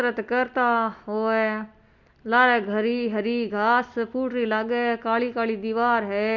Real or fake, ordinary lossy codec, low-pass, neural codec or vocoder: real; none; 7.2 kHz; none